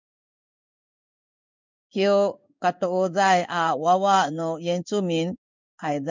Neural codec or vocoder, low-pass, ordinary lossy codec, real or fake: codec, 16 kHz in and 24 kHz out, 1 kbps, XY-Tokenizer; 7.2 kHz; MP3, 64 kbps; fake